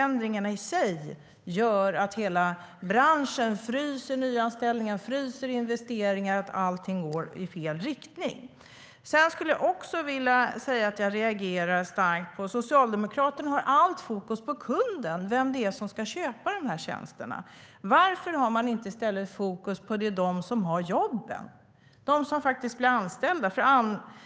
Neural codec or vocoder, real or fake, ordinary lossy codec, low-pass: codec, 16 kHz, 8 kbps, FunCodec, trained on Chinese and English, 25 frames a second; fake; none; none